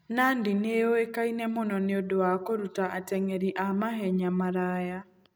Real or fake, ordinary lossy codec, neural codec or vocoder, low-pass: real; none; none; none